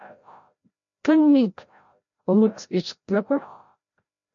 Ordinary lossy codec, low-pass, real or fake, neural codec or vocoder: MP3, 48 kbps; 7.2 kHz; fake; codec, 16 kHz, 0.5 kbps, FreqCodec, larger model